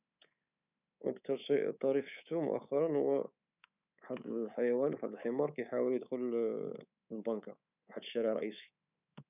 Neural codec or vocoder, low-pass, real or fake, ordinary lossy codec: codec, 24 kHz, 3.1 kbps, DualCodec; 3.6 kHz; fake; none